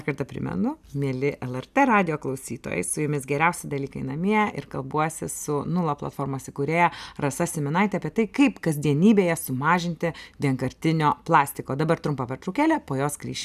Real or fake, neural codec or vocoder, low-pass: real; none; 14.4 kHz